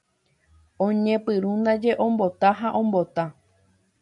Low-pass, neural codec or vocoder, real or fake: 10.8 kHz; none; real